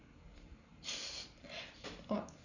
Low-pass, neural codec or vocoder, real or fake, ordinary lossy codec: 7.2 kHz; none; real; none